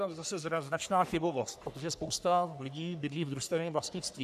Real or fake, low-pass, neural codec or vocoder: fake; 14.4 kHz; codec, 44.1 kHz, 3.4 kbps, Pupu-Codec